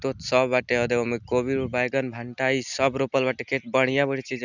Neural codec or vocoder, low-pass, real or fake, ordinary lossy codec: none; 7.2 kHz; real; none